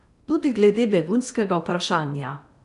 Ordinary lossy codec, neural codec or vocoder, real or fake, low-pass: none; codec, 16 kHz in and 24 kHz out, 0.8 kbps, FocalCodec, streaming, 65536 codes; fake; 10.8 kHz